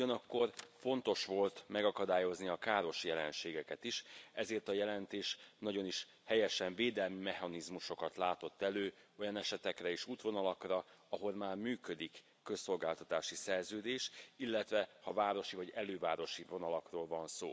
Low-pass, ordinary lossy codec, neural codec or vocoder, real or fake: none; none; none; real